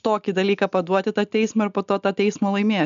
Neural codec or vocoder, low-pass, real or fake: none; 7.2 kHz; real